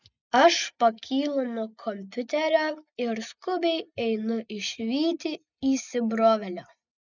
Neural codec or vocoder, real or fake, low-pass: none; real; 7.2 kHz